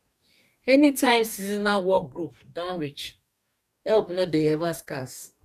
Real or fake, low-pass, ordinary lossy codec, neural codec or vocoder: fake; 14.4 kHz; none; codec, 44.1 kHz, 2.6 kbps, DAC